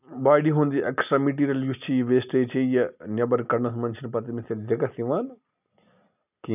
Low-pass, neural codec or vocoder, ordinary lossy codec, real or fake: 3.6 kHz; none; none; real